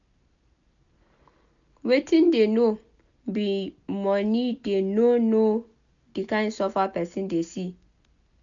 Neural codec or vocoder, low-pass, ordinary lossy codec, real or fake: none; 7.2 kHz; none; real